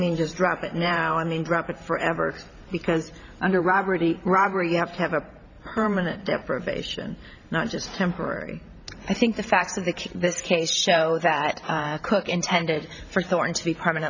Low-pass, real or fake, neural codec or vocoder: 7.2 kHz; fake; vocoder, 44.1 kHz, 128 mel bands every 512 samples, BigVGAN v2